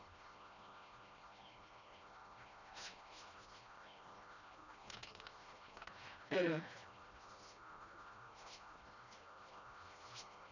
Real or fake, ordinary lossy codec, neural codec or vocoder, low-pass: fake; none; codec, 16 kHz, 1 kbps, FreqCodec, smaller model; 7.2 kHz